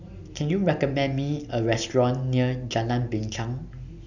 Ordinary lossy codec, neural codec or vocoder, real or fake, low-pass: none; none; real; 7.2 kHz